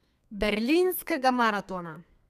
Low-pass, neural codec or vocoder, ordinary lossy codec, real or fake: 14.4 kHz; codec, 32 kHz, 1.9 kbps, SNAC; none; fake